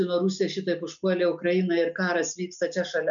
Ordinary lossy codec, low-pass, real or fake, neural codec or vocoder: MP3, 96 kbps; 7.2 kHz; real; none